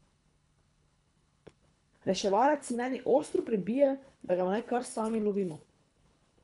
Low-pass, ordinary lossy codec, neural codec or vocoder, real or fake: 10.8 kHz; none; codec, 24 kHz, 3 kbps, HILCodec; fake